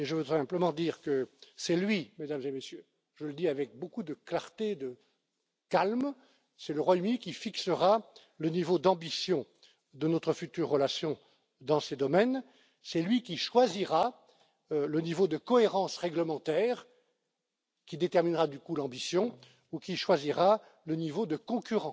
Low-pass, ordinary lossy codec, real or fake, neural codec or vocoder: none; none; real; none